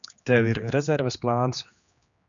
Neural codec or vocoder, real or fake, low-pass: codec, 16 kHz, 2 kbps, X-Codec, HuBERT features, trained on general audio; fake; 7.2 kHz